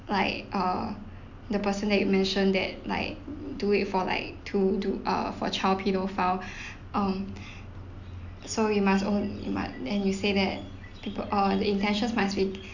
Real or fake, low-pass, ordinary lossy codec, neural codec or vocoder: real; 7.2 kHz; none; none